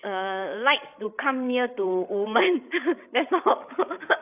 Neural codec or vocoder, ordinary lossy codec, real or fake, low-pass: codec, 16 kHz, 8 kbps, FreqCodec, larger model; none; fake; 3.6 kHz